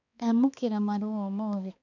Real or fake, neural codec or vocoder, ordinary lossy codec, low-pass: fake; codec, 16 kHz, 2 kbps, X-Codec, HuBERT features, trained on balanced general audio; none; 7.2 kHz